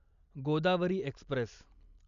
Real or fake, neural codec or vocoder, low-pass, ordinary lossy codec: real; none; 7.2 kHz; none